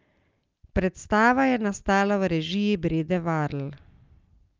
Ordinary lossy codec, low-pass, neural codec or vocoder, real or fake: Opus, 24 kbps; 7.2 kHz; none; real